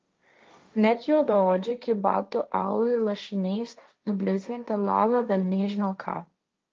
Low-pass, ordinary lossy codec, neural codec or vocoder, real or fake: 7.2 kHz; Opus, 24 kbps; codec, 16 kHz, 1.1 kbps, Voila-Tokenizer; fake